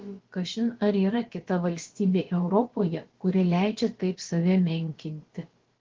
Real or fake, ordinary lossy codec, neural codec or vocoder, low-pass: fake; Opus, 16 kbps; codec, 16 kHz, about 1 kbps, DyCAST, with the encoder's durations; 7.2 kHz